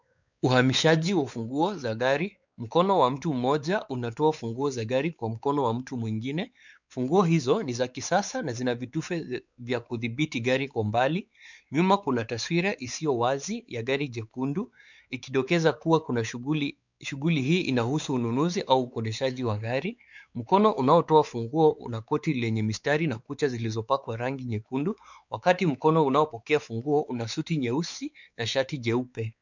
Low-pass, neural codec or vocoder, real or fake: 7.2 kHz; codec, 16 kHz, 4 kbps, X-Codec, WavLM features, trained on Multilingual LibriSpeech; fake